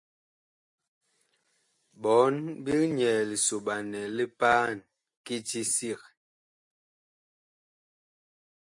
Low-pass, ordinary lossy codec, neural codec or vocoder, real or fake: 10.8 kHz; MP3, 48 kbps; none; real